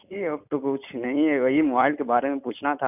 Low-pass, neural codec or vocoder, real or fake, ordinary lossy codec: 3.6 kHz; none; real; none